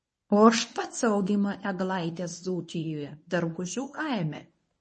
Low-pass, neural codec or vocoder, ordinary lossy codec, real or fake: 10.8 kHz; codec, 24 kHz, 0.9 kbps, WavTokenizer, medium speech release version 1; MP3, 32 kbps; fake